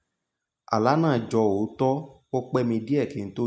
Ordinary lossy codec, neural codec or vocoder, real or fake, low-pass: none; none; real; none